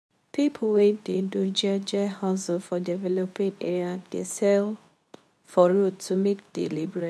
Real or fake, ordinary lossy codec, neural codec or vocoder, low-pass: fake; none; codec, 24 kHz, 0.9 kbps, WavTokenizer, medium speech release version 2; none